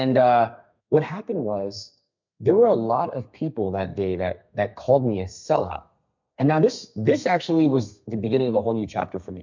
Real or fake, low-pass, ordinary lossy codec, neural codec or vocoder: fake; 7.2 kHz; MP3, 64 kbps; codec, 32 kHz, 1.9 kbps, SNAC